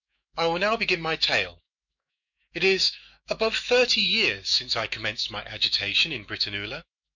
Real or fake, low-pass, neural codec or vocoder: fake; 7.2 kHz; autoencoder, 48 kHz, 128 numbers a frame, DAC-VAE, trained on Japanese speech